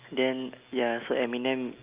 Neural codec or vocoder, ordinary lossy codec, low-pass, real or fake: none; Opus, 24 kbps; 3.6 kHz; real